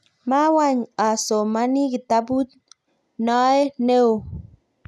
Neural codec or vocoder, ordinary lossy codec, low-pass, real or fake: none; none; none; real